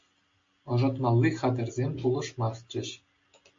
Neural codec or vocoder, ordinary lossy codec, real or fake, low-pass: none; MP3, 48 kbps; real; 7.2 kHz